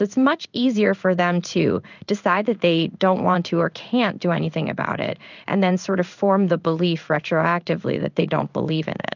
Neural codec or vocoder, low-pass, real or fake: codec, 16 kHz in and 24 kHz out, 1 kbps, XY-Tokenizer; 7.2 kHz; fake